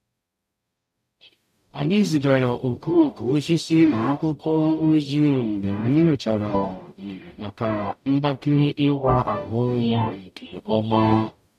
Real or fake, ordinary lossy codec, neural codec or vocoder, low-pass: fake; none; codec, 44.1 kHz, 0.9 kbps, DAC; 14.4 kHz